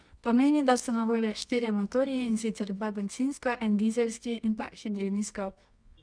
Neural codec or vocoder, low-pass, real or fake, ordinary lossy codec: codec, 24 kHz, 0.9 kbps, WavTokenizer, medium music audio release; 9.9 kHz; fake; none